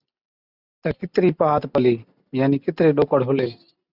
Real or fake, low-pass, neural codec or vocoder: real; 5.4 kHz; none